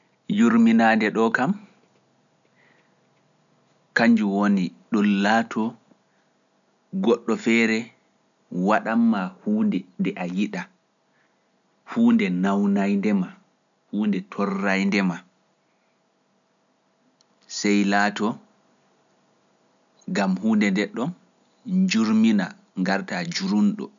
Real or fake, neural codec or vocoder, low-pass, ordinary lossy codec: real; none; 7.2 kHz; none